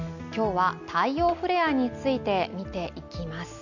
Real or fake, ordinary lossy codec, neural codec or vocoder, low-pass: real; none; none; 7.2 kHz